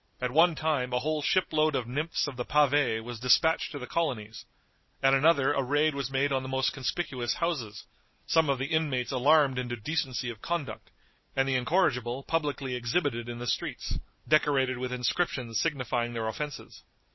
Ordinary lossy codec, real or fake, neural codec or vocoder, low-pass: MP3, 24 kbps; real; none; 7.2 kHz